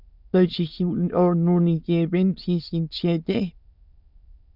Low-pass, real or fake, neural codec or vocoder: 5.4 kHz; fake; autoencoder, 22.05 kHz, a latent of 192 numbers a frame, VITS, trained on many speakers